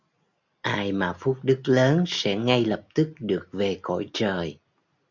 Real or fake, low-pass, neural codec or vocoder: real; 7.2 kHz; none